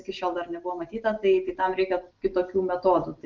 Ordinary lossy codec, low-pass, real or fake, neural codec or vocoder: Opus, 32 kbps; 7.2 kHz; real; none